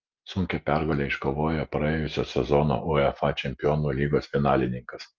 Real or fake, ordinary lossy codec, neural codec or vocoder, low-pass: real; Opus, 32 kbps; none; 7.2 kHz